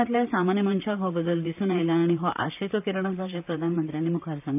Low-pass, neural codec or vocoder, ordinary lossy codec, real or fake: 3.6 kHz; vocoder, 44.1 kHz, 128 mel bands, Pupu-Vocoder; none; fake